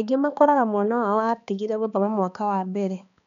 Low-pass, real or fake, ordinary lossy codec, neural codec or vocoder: 7.2 kHz; fake; none; codec, 16 kHz, 2 kbps, X-Codec, HuBERT features, trained on balanced general audio